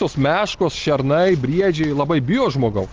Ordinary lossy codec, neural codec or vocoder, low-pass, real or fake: Opus, 32 kbps; none; 7.2 kHz; real